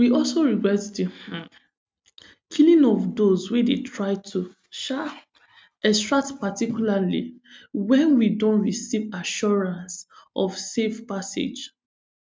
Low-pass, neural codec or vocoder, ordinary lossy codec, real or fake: none; none; none; real